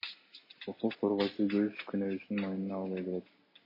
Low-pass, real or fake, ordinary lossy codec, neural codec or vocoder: 5.4 kHz; real; MP3, 24 kbps; none